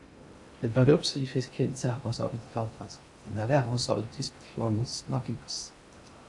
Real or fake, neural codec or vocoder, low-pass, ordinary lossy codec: fake; codec, 16 kHz in and 24 kHz out, 0.6 kbps, FocalCodec, streaming, 2048 codes; 10.8 kHz; AAC, 64 kbps